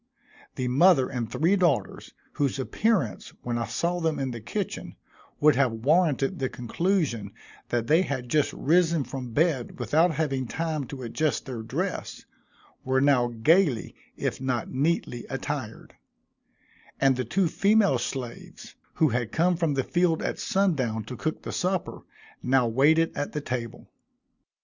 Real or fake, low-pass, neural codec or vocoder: real; 7.2 kHz; none